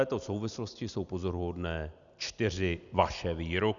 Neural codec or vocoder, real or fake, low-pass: none; real; 7.2 kHz